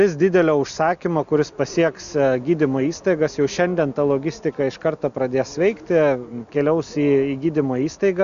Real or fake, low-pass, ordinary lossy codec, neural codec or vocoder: real; 7.2 kHz; MP3, 96 kbps; none